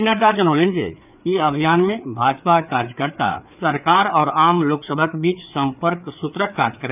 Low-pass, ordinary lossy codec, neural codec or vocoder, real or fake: 3.6 kHz; none; codec, 16 kHz, 4 kbps, FreqCodec, larger model; fake